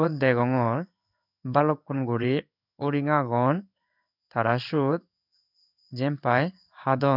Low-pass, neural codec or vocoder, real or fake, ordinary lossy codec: 5.4 kHz; codec, 16 kHz in and 24 kHz out, 1 kbps, XY-Tokenizer; fake; none